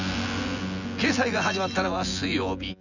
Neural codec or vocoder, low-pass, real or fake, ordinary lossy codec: vocoder, 24 kHz, 100 mel bands, Vocos; 7.2 kHz; fake; none